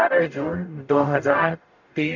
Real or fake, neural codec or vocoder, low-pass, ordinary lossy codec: fake; codec, 44.1 kHz, 0.9 kbps, DAC; 7.2 kHz; none